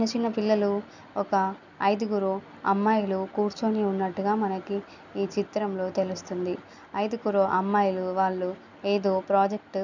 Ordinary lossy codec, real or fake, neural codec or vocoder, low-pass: none; real; none; 7.2 kHz